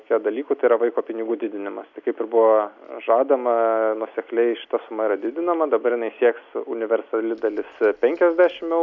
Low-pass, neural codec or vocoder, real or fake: 7.2 kHz; none; real